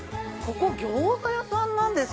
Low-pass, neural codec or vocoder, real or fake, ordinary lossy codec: none; none; real; none